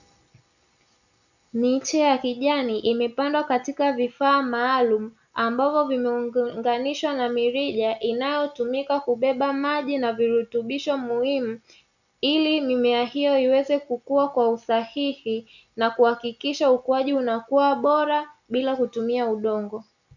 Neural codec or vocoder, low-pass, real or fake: none; 7.2 kHz; real